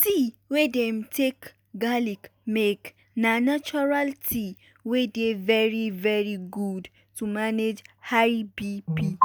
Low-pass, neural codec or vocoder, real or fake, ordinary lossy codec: none; none; real; none